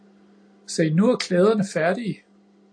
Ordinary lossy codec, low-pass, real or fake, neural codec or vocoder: AAC, 48 kbps; 9.9 kHz; real; none